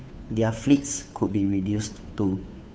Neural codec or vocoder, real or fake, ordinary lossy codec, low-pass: codec, 16 kHz, 2 kbps, FunCodec, trained on Chinese and English, 25 frames a second; fake; none; none